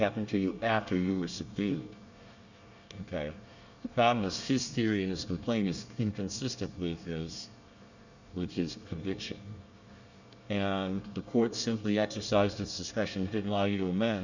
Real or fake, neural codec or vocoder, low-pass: fake; codec, 24 kHz, 1 kbps, SNAC; 7.2 kHz